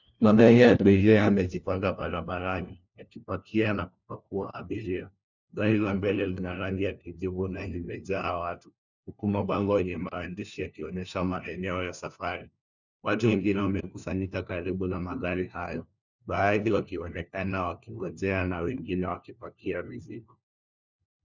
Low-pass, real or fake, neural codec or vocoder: 7.2 kHz; fake; codec, 16 kHz, 1 kbps, FunCodec, trained on LibriTTS, 50 frames a second